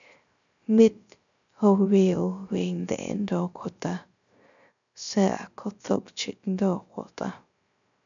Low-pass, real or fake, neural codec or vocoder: 7.2 kHz; fake; codec, 16 kHz, 0.3 kbps, FocalCodec